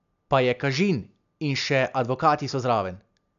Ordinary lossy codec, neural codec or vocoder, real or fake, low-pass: MP3, 96 kbps; none; real; 7.2 kHz